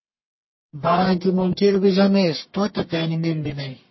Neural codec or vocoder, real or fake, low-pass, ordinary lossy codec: codec, 44.1 kHz, 1.7 kbps, Pupu-Codec; fake; 7.2 kHz; MP3, 24 kbps